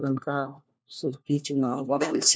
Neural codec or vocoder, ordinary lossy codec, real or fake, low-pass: codec, 16 kHz, 1 kbps, FunCodec, trained on LibriTTS, 50 frames a second; none; fake; none